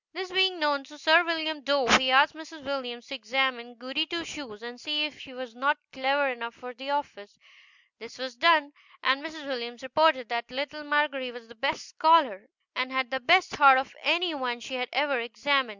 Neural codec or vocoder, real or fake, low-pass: none; real; 7.2 kHz